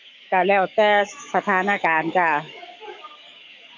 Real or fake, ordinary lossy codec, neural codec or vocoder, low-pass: fake; MP3, 64 kbps; codec, 44.1 kHz, 7.8 kbps, DAC; 7.2 kHz